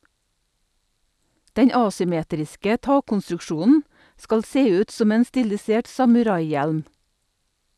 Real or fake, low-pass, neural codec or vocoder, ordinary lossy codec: real; none; none; none